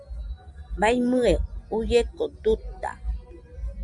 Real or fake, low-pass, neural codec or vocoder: real; 10.8 kHz; none